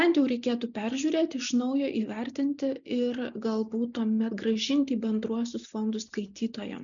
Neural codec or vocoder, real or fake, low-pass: none; real; 7.2 kHz